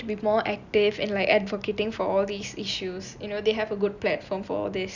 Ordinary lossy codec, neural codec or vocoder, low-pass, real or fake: none; none; 7.2 kHz; real